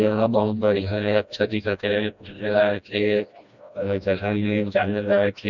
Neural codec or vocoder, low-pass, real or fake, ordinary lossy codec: codec, 16 kHz, 1 kbps, FreqCodec, smaller model; 7.2 kHz; fake; none